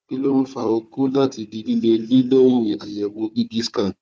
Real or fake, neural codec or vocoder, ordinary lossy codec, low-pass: fake; codec, 16 kHz, 4 kbps, FunCodec, trained on Chinese and English, 50 frames a second; none; none